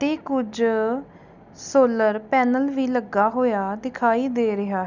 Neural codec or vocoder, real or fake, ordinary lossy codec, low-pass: none; real; none; 7.2 kHz